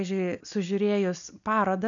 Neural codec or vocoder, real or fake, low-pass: none; real; 7.2 kHz